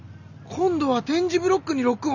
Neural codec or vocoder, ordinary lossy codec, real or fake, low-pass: none; none; real; 7.2 kHz